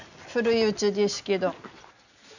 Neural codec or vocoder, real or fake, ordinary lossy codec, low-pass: none; real; none; 7.2 kHz